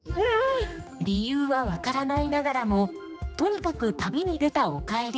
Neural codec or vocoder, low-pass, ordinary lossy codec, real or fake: codec, 16 kHz, 2 kbps, X-Codec, HuBERT features, trained on general audio; none; none; fake